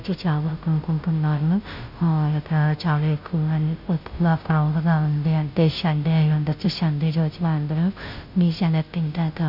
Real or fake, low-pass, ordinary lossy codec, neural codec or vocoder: fake; 5.4 kHz; none; codec, 16 kHz, 0.5 kbps, FunCodec, trained on Chinese and English, 25 frames a second